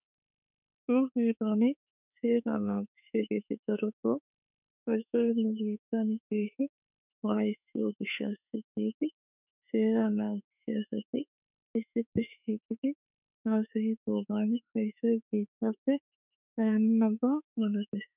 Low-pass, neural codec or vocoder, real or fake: 3.6 kHz; autoencoder, 48 kHz, 32 numbers a frame, DAC-VAE, trained on Japanese speech; fake